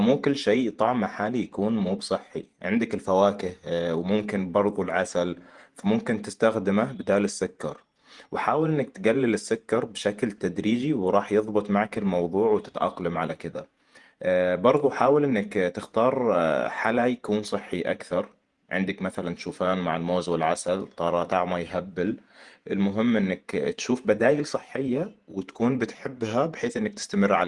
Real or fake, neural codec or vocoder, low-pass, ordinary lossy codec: fake; vocoder, 48 kHz, 128 mel bands, Vocos; 10.8 kHz; Opus, 24 kbps